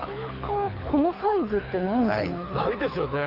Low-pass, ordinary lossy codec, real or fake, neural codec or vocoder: 5.4 kHz; AAC, 32 kbps; fake; codec, 24 kHz, 6 kbps, HILCodec